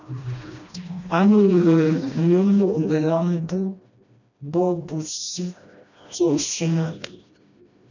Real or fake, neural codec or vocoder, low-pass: fake; codec, 16 kHz, 1 kbps, FreqCodec, smaller model; 7.2 kHz